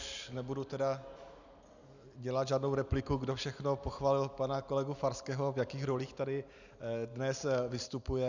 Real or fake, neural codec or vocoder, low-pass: real; none; 7.2 kHz